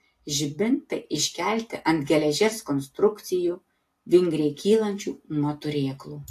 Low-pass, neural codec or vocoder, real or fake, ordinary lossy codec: 14.4 kHz; none; real; AAC, 64 kbps